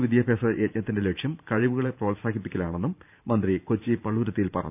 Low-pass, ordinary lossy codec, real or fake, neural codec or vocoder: 3.6 kHz; none; fake; vocoder, 44.1 kHz, 128 mel bands every 512 samples, BigVGAN v2